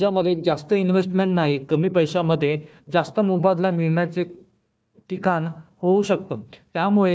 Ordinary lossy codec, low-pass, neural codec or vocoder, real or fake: none; none; codec, 16 kHz, 1 kbps, FunCodec, trained on Chinese and English, 50 frames a second; fake